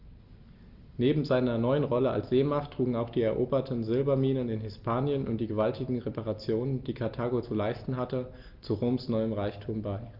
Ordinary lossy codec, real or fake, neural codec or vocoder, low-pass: Opus, 32 kbps; real; none; 5.4 kHz